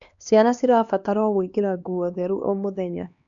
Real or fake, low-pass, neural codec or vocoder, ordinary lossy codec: fake; 7.2 kHz; codec, 16 kHz, 2 kbps, X-Codec, HuBERT features, trained on LibriSpeech; none